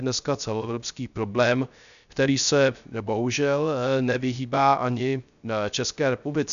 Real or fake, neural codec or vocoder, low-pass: fake; codec, 16 kHz, 0.3 kbps, FocalCodec; 7.2 kHz